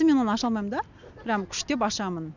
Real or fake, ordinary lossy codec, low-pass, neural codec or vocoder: real; none; 7.2 kHz; none